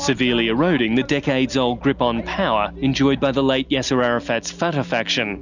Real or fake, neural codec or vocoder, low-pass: real; none; 7.2 kHz